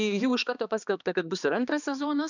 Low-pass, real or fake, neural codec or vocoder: 7.2 kHz; fake; codec, 16 kHz, 2 kbps, X-Codec, HuBERT features, trained on balanced general audio